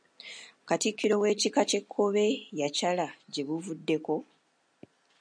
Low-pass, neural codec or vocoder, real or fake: 9.9 kHz; none; real